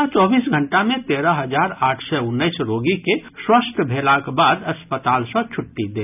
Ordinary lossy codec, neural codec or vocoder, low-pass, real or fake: none; none; 3.6 kHz; real